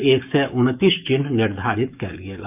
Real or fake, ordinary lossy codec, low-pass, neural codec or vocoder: fake; none; 3.6 kHz; codec, 44.1 kHz, 7.8 kbps, DAC